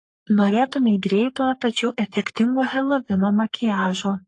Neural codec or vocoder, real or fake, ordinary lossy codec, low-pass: codec, 44.1 kHz, 3.4 kbps, Pupu-Codec; fake; AAC, 48 kbps; 10.8 kHz